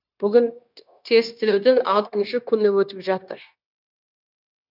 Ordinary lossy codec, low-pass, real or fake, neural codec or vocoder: none; 5.4 kHz; fake; codec, 16 kHz, 0.9 kbps, LongCat-Audio-Codec